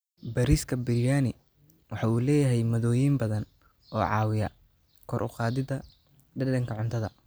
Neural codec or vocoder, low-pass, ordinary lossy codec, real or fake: none; none; none; real